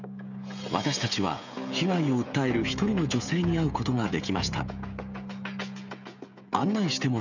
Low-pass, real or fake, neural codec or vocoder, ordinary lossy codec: 7.2 kHz; fake; codec, 16 kHz, 16 kbps, FreqCodec, smaller model; none